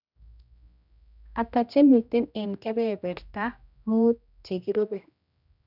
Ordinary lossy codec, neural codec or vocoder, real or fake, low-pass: none; codec, 16 kHz, 1 kbps, X-Codec, HuBERT features, trained on general audio; fake; 5.4 kHz